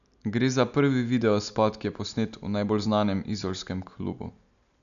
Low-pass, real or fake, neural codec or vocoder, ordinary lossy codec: 7.2 kHz; real; none; none